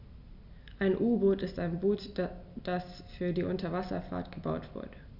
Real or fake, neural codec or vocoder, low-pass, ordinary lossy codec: real; none; 5.4 kHz; none